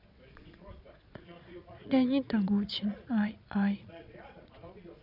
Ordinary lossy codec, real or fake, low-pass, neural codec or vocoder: none; fake; 5.4 kHz; vocoder, 22.05 kHz, 80 mel bands, Vocos